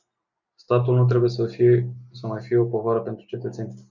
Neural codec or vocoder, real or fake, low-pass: none; real; 7.2 kHz